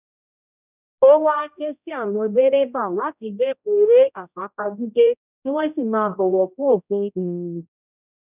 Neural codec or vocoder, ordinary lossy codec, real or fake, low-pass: codec, 16 kHz, 0.5 kbps, X-Codec, HuBERT features, trained on general audio; none; fake; 3.6 kHz